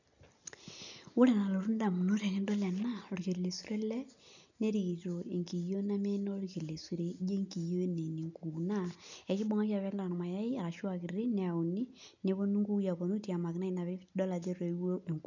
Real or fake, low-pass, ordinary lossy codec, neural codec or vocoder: real; 7.2 kHz; none; none